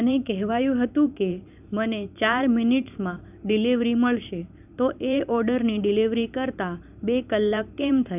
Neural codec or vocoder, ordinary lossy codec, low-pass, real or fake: vocoder, 44.1 kHz, 128 mel bands every 512 samples, BigVGAN v2; none; 3.6 kHz; fake